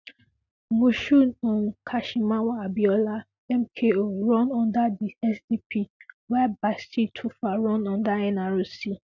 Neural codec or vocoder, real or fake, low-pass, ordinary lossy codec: none; real; 7.2 kHz; none